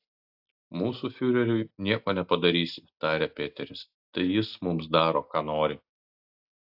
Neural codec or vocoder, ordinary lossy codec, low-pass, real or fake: none; AAC, 48 kbps; 5.4 kHz; real